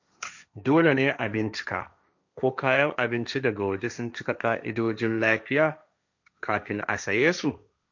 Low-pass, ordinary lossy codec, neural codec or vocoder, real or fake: 7.2 kHz; none; codec, 16 kHz, 1.1 kbps, Voila-Tokenizer; fake